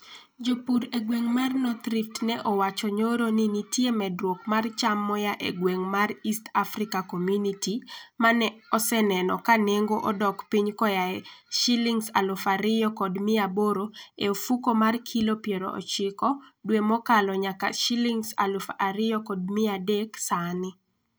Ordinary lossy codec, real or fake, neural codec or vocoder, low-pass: none; real; none; none